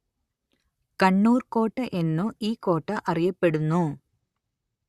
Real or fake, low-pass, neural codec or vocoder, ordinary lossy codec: fake; 14.4 kHz; vocoder, 44.1 kHz, 128 mel bands, Pupu-Vocoder; Opus, 64 kbps